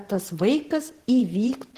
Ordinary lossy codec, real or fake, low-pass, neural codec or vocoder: Opus, 16 kbps; fake; 14.4 kHz; vocoder, 44.1 kHz, 128 mel bands, Pupu-Vocoder